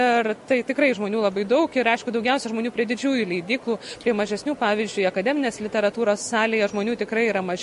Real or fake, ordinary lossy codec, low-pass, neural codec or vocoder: real; MP3, 48 kbps; 14.4 kHz; none